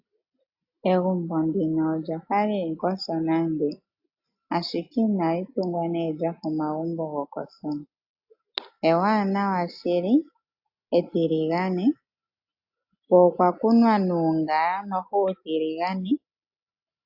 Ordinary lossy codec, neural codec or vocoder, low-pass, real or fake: AAC, 48 kbps; none; 5.4 kHz; real